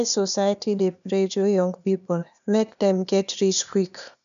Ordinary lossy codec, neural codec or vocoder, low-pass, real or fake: none; codec, 16 kHz, 0.8 kbps, ZipCodec; 7.2 kHz; fake